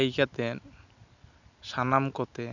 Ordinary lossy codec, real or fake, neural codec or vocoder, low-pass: none; real; none; 7.2 kHz